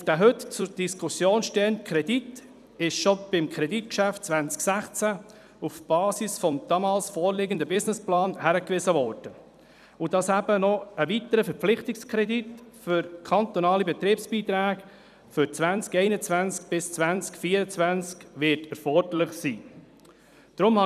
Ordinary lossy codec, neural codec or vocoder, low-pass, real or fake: none; vocoder, 44.1 kHz, 128 mel bands every 256 samples, BigVGAN v2; 14.4 kHz; fake